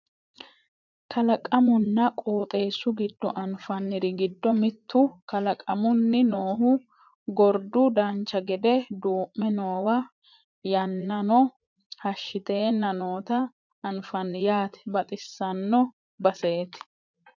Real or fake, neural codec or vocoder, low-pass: fake; vocoder, 44.1 kHz, 80 mel bands, Vocos; 7.2 kHz